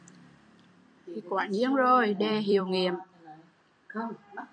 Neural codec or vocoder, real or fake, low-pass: vocoder, 44.1 kHz, 128 mel bands every 256 samples, BigVGAN v2; fake; 9.9 kHz